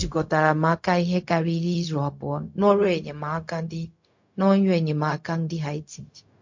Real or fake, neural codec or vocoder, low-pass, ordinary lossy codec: fake; codec, 16 kHz, 0.4 kbps, LongCat-Audio-Codec; 7.2 kHz; MP3, 48 kbps